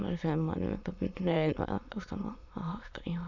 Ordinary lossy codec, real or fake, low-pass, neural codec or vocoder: none; fake; 7.2 kHz; autoencoder, 22.05 kHz, a latent of 192 numbers a frame, VITS, trained on many speakers